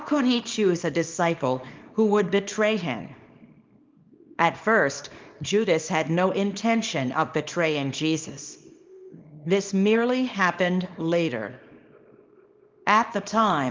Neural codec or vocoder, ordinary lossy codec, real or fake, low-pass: codec, 24 kHz, 0.9 kbps, WavTokenizer, small release; Opus, 24 kbps; fake; 7.2 kHz